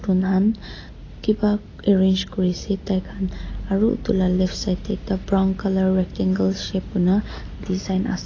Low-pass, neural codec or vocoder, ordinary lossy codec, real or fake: 7.2 kHz; none; AAC, 32 kbps; real